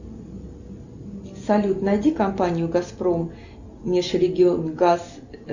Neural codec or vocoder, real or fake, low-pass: none; real; 7.2 kHz